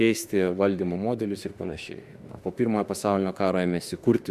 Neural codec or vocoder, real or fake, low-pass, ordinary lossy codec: autoencoder, 48 kHz, 32 numbers a frame, DAC-VAE, trained on Japanese speech; fake; 14.4 kHz; Opus, 64 kbps